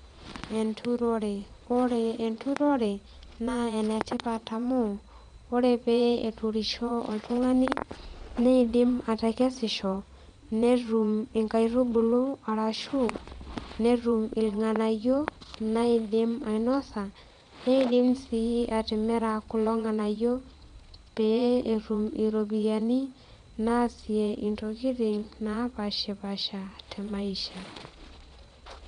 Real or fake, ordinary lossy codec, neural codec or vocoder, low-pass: fake; MP3, 64 kbps; vocoder, 22.05 kHz, 80 mel bands, Vocos; 9.9 kHz